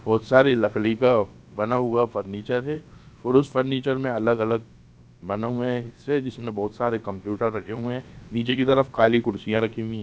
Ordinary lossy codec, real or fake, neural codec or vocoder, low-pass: none; fake; codec, 16 kHz, about 1 kbps, DyCAST, with the encoder's durations; none